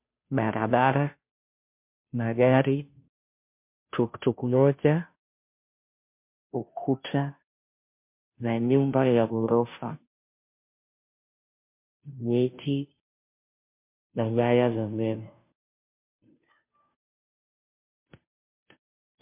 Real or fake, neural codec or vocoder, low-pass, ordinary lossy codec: fake; codec, 16 kHz, 0.5 kbps, FunCodec, trained on Chinese and English, 25 frames a second; 3.6 kHz; MP3, 32 kbps